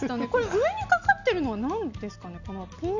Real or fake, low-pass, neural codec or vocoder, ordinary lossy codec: real; 7.2 kHz; none; none